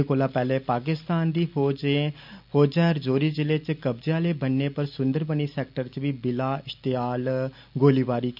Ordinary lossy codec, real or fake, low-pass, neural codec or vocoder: none; real; 5.4 kHz; none